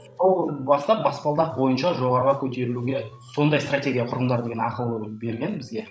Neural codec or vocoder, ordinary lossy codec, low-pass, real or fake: codec, 16 kHz, 16 kbps, FreqCodec, larger model; none; none; fake